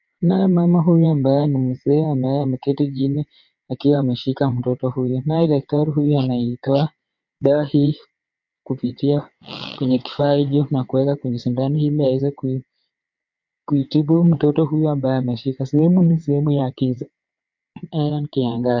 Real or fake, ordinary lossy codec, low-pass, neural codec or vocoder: fake; MP3, 48 kbps; 7.2 kHz; vocoder, 22.05 kHz, 80 mel bands, WaveNeXt